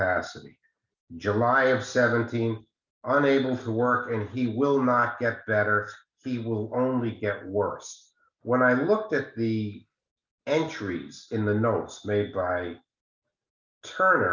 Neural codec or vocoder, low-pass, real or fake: none; 7.2 kHz; real